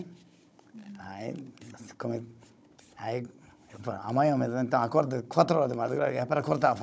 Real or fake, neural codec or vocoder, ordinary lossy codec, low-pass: fake; codec, 16 kHz, 16 kbps, FunCodec, trained on Chinese and English, 50 frames a second; none; none